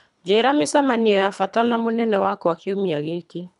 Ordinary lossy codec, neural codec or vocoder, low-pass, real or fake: none; codec, 24 kHz, 3 kbps, HILCodec; 10.8 kHz; fake